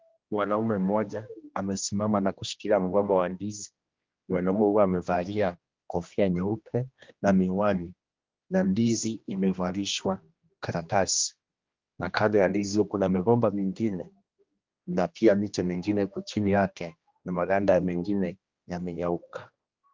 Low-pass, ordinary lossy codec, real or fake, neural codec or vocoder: 7.2 kHz; Opus, 32 kbps; fake; codec, 16 kHz, 1 kbps, X-Codec, HuBERT features, trained on general audio